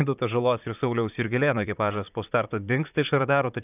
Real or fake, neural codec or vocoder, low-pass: fake; vocoder, 44.1 kHz, 128 mel bands, Pupu-Vocoder; 3.6 kHz